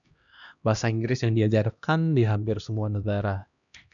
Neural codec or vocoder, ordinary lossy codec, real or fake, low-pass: codec, 16 kHz, 1 kbps, X-Codec, HuBERT features, trained on LibriSpeech; MP3, 96 kbps; fake; 7.2 kHz